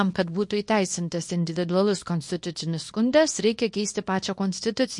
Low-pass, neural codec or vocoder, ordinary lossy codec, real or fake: 10.8 kHz; codec, 24 kHz, 0.9 kbps, WavTokenizer, small release; MP3, 48 kbps; fake